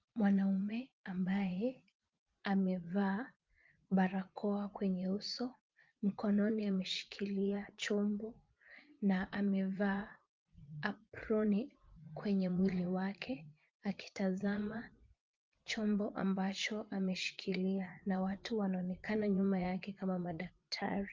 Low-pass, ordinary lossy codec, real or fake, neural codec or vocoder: 7.2 kHz; Opus, 32 kbps; fake; vocoder, 44.1 kHz, 80 mel bands, Vocos